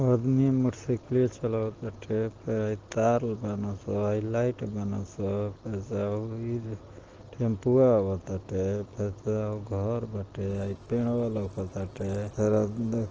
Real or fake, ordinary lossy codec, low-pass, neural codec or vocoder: real; Opus, 16 kbps; 7.2 kHz; none